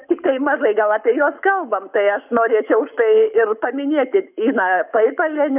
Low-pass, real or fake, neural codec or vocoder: 3.6 kHz; fake; codec, 44.1 kHz, 7.8 kbps, Pupu-Codec